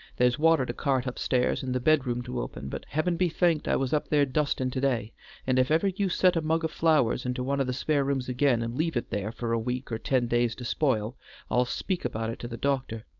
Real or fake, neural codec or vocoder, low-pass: fake; codec, 16 kHz, 4.8 kbps, FACodec; 7.2 kHz